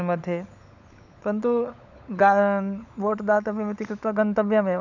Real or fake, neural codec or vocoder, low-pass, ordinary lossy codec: fake; codec, 16 kHz, 16 kbps, FunCodec, trained on LibriTTS, 50 frames a second; 7.2 kHz; none